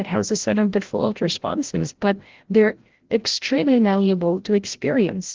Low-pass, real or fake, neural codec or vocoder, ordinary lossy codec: 7.2 kHz; fake; codec, 16 kHz, 0.5 kbps, FreqCodec, larger model; Opus, 16 kbps